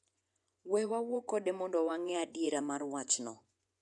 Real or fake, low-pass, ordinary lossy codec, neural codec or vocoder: fake; 10.8 kHz; none; vocoder, 24 kHz, 100 mel bands, Vocos